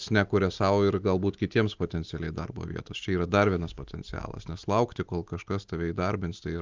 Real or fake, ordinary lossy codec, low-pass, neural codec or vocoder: real; Opus, 24 kbps; 7.2 kHz; none